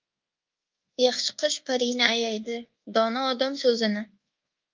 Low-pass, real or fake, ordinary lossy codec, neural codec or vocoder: 7.2 kHz; fake; Opus, 32 kbps; codec, 24 kHz, 1.2 kbps, DualCodec